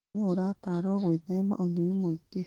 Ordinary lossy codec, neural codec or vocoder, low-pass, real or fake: Opus, 16 kbps; autoencoder, 48 kHz, 32 numbers a frame, DAC-VAE, trained on Japanese speech; 19.8 kHz; fake